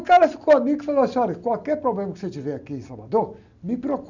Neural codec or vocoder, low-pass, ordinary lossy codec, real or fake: none; 7.2 kHz; none; real